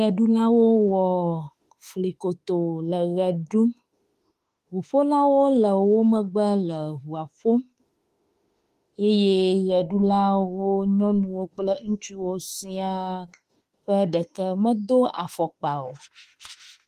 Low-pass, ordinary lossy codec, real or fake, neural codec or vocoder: 14.4 kHz; Opus, 24 kbps; fake; autoencoder, 48 kHz, 32 numbers a frame, DAC-VAE, trained on Japanese speech